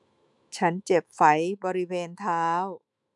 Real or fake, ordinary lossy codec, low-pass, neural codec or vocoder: fake; none; 10.8 kHz; autoencoder, 48 kHz, 128 numbers a frame, DAC-VAE, trained on Japanese speech